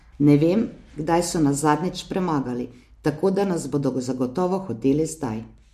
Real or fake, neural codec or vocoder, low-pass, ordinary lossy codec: real; none; 14.4 kHz; MP3, 64 kbps